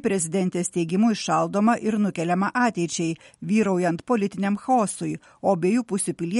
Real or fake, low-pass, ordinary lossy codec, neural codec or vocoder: real; 19.8 kHz; MP3, 48 kbps; none